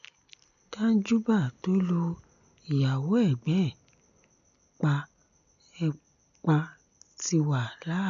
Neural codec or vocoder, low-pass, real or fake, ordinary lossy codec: none; 7.2 kHz; real; none